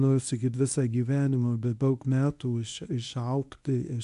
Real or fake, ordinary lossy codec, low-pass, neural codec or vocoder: fake; MP3, 64 kbps; 10.8 kHz; codec, 24 kHz, 0.9 kbps, WavTokenizer, medium speech release version 1